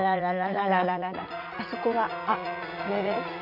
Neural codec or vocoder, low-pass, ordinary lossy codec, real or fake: vocoder, 44.1 kHz, 80 mel bands, Vocos; 5.4 kHz; MP3, 48 kbps; fake